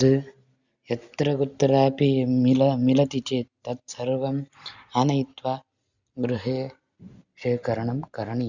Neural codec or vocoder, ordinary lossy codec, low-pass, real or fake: none; Opus, 64 kbps; 7.2 kHz; real